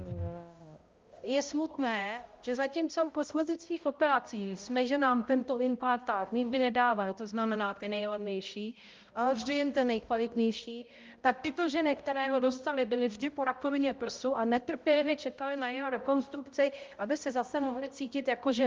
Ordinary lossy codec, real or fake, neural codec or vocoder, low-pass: Opus, 32 kbps; fake; codec, 16 kHz, 0.5 kbps, X-Codec, HuBERT features, trained on balanced general audio; 7.2 kHz